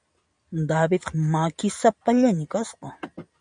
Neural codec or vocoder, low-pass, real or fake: none; 9.9 kHz; real